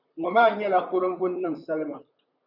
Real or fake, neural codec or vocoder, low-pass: fake; vocoder, 44.1 kHz, 128 mel bands, Pupu-Vocoder; 5.4 kHz